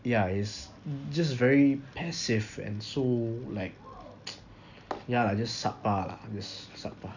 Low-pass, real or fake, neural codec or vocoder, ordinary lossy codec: 7.2 kHz; real; none; none